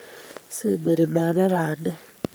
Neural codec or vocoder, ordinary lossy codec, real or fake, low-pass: codec, 44.1 kHz, 3.4 kbps, Pupu-Codec; none; fake; none